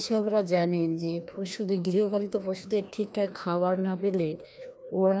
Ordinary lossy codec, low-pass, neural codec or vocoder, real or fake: none; none; codec, 16 kHz, 1 kbps, FreqCodec, larger model; fake